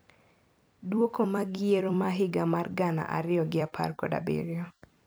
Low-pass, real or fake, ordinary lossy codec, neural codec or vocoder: none; fake; none; vocoder, 44.1 kHz, 128 mel bands every 256 samples, BigVGAN v2